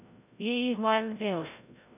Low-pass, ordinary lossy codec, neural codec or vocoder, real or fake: 3.6 kHz; none; codec, 16 kHz, 0.5 kbps, FreqCodec, larger model; fake